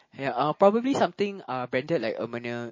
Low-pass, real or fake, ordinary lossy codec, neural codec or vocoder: 7.2 kHz; real; MP3, 32 kbps; none